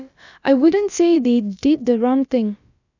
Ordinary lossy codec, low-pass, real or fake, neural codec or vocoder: none; 7.2 kHz; fake; codec, 16 kHz, about 1 kbps, DyCAST, with the encoder's durations